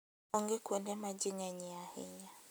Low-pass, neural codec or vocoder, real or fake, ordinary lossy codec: none; none; real; none